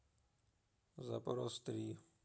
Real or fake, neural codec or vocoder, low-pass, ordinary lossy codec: real; none; none; none